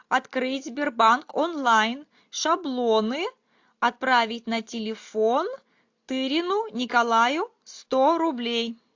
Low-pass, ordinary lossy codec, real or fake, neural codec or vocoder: 7.2 kHz; AAC, 48 kbps; real; none